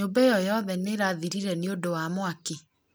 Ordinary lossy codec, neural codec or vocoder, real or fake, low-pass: none; none; real; none